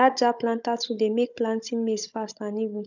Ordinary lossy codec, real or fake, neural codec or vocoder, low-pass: none; fake; codec, 16 kHz, 16 kbps, FunCodec, trained on Chinese and English, 50 frames a second; 7.2 kHz